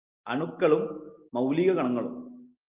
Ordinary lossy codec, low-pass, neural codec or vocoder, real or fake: Opus, 24 kbps; 3.6 kHz; none; real